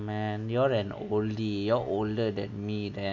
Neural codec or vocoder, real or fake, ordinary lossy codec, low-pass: none; real; none; 7.2 kHz